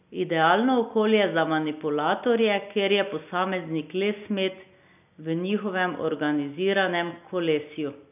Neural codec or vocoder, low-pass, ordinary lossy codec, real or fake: none; 3.6 kHz; none; real